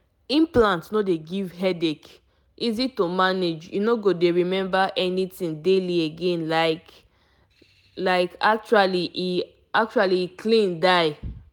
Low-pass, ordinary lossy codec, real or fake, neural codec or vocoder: 19.8 kHz; none; real; none